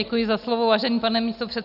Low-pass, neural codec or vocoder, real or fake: 5.4 kHz; none; real